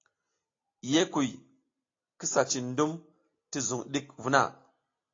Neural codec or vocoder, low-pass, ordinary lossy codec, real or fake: none; 7.2 kHz; AAC, 32 kbps; real